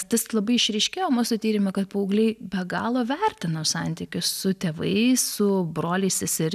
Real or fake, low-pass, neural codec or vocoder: real; 14.4 kHz; none